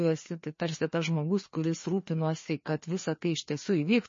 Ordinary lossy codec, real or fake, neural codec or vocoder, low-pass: MP3, 32 kbps; fake; codec, 16 kHz, 2 kbps, FreqCodec, larger model; 7.2 kHz